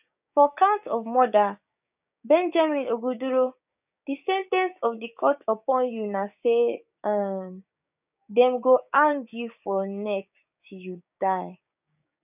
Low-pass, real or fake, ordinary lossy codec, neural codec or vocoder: 3.6 kHz; fake; none; codec, 16 kHz, 6 kbps, DAC